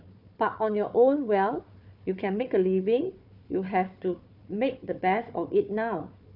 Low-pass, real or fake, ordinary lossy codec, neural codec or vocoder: 5.4 kHz; fake; MP3, 48 kbps; codec, 16 kHz, 4 kbps, FunCodec, trained on Chinese and English, 50 frames a second